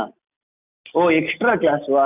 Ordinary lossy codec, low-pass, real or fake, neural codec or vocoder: none; 3.6 kHz; real; none